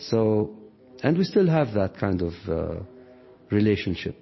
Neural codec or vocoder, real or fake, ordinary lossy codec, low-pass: none; real; MP3, 24 kbps; 7.2 kHz